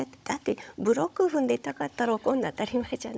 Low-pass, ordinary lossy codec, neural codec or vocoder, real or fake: none; none; codec, 16 kHz, 16 kbps, FunCodec, trained on Chinese and English, 50 frames a second; fake